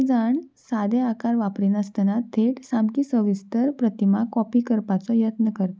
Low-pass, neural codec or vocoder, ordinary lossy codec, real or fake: none; none; none; real